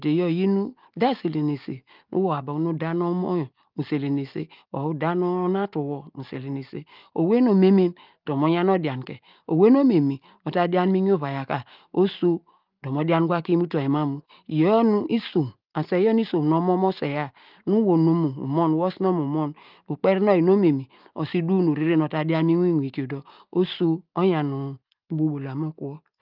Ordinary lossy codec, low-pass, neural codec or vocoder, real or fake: Opus, 24 kbps; 5.4 kHz; none; real